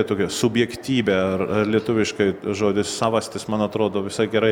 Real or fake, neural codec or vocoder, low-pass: real; none; 19.8 kHz